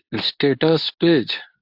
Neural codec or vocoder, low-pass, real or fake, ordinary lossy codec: codec, 24 kHz, 0.9 kbps, WavTokenizer, medium speech release version 2; 5.4 kHz; fake; AAC, 48 kbps